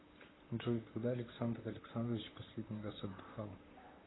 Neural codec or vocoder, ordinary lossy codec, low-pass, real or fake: vocoder, 44.1 kHz, 128 mel bands, Pupu-Vocoder; AAC, 16 kbps; 7.2 kHz; fake